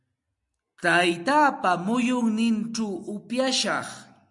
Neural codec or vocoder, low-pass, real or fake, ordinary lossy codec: none; 10.8 kHz; real; MP3, 64 kbps